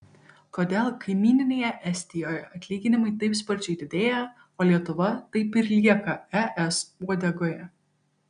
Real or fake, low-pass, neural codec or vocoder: real; 9.9 kHz; none